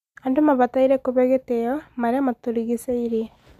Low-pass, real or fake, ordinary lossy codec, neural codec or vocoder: 14.4 kHz; real; none; none